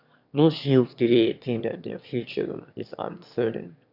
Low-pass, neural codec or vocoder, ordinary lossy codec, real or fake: 5.4 kHz; autoencoder, 22.05 kHz, a latent of 192 numbers a frame, VITS, trained on one speaker; none; fake